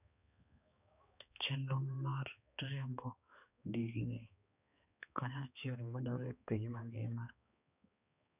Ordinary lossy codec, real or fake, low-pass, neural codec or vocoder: none; fake; 3.6 kHz; codec, 16 kHz, 2 kbps, X-Codec, HuBERT features, trained on balanced general audio